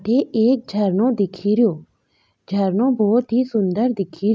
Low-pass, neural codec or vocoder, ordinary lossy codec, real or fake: none; none; none; real